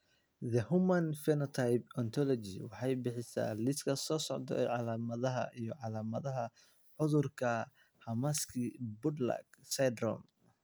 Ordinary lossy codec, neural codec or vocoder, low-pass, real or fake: none; none; none; real